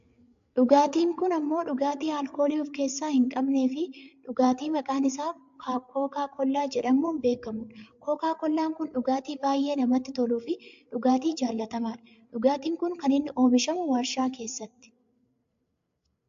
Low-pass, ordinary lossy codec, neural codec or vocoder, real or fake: 7.2 kHz; AAC, 64 kbps; codec, 16 kHz, 8 kbps, FreqCodec, larger model; fake